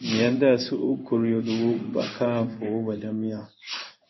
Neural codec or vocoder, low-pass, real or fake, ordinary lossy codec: codec, 16 kHz in and 24 kHz out, 1 kbps, XY-Tokenizer; 7.2 kHz; fake; MP3, 24 kbps